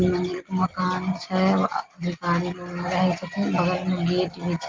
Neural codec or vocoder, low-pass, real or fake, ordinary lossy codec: none; 7.2 kHz; real; Opus, 32 kbps